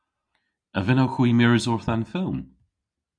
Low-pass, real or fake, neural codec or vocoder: 9.9 kHz; real; none